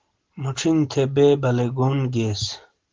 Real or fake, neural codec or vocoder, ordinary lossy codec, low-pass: real; none; Opus, 16 kbps; 7.2 kHz